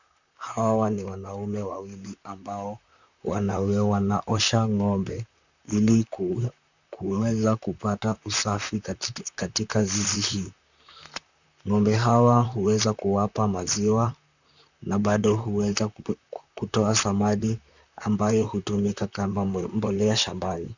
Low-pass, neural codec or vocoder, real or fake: 7.2 kHz; vocoder, 44.1 kHz, 128 mel bands, Pupu-Vocoder; fake